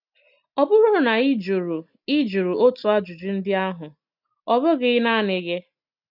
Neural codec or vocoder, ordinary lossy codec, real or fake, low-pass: none; none; real; 5.4 kHz